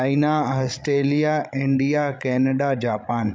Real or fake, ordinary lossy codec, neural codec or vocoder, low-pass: fake; none; codec, 16 kHz, 16 kbps, FreqCodec, larger model; none